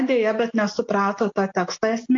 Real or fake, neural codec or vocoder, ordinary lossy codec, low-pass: real; none; AAC, 48 kbps; 7.2 kHz